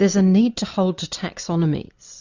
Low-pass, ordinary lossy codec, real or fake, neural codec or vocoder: 7.2 kHz; Opus, 64 kbps; real; none